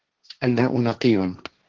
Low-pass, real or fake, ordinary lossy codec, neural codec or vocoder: 7.2 kHz; fake; Opus, 16 kbps; codec, 16 kHz, 1.1 kbps, Voila-Tokenizer